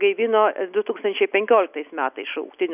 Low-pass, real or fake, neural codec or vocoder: 3.6 kHz; real; none